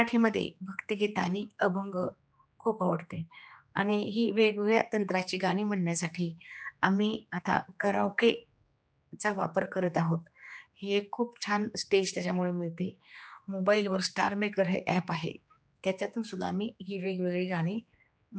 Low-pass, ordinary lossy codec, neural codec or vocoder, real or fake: none; none; codec, 16 kHz, 2 kbps, X-Codec, HuBERT features, trained on general audio; fake